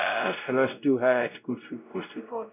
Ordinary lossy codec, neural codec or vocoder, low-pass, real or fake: none; codec, 16 kHz, 0.5 kbps, X-Codec, WavLM features, trained on Multilingual LibriSpeech; 3.6 kHz; fake